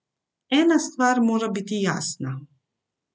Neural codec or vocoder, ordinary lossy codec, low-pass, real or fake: none; none; none; real